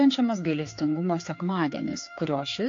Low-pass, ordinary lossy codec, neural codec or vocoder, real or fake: 7.2 kHz; AAC, 48 kbps; codec, 16 kHz, 4 kbps, X-Codec, HuBERT features, trained on general audio; fake